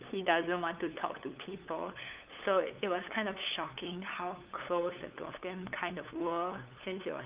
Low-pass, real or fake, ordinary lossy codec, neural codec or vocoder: 3.6 kHz; fake; Opus, 24 kbps; codec, 16 kHz, 8 kbps, FunCodec, trained on LibriTTS, 25 frames a second